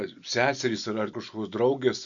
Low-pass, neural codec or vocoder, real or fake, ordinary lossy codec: 7.2 kHz; none; real; MP3, 96 kbps